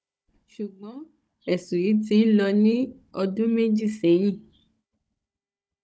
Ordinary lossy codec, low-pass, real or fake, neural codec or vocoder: none; none; fake; codec, 16 kHz, 16 kbps, FunCodec, trained on Chinese and English, 50 frames a second